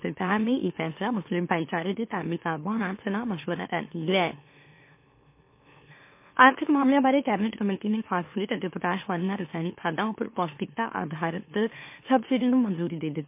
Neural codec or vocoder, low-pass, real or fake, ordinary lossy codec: autoencoder, 44.1 kHz, a latent of 192 numbers a frame, MeloTTS; 3.6 kHz; fake; MP3, 24 kbps